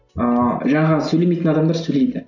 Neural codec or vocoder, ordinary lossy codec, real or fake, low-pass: none; none; real; 7.2 kHz